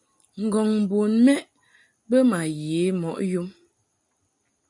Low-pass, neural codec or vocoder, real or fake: 10.8 kHz; none; real